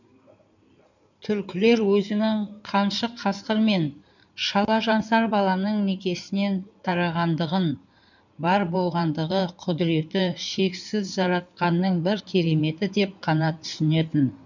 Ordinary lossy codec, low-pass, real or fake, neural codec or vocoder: none; 7.2 kHz; fake; codec, 16 kHz in and 24 kHz out, 2.2 kbps, FireRedTTS-2 codec